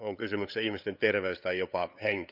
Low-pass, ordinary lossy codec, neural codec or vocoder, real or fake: 5.4 kHz; none; codec, 16 kHz, 8 kbps, FunCodec, trained on LibriTTS, 25 frames a second; fake